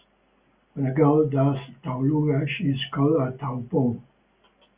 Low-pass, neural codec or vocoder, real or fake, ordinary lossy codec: 3.6 kHz; none; real; Opus, 64 kbps